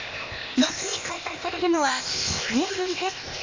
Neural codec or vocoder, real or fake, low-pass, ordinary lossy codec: codec, 16 kHz, 0.8 kbps, ZipCodec; fake; 7.2 kHz; MP3, 64 kbps